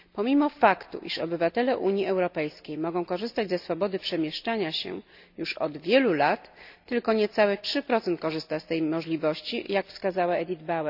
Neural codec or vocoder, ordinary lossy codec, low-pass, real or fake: none; none; 5.4 kHz; real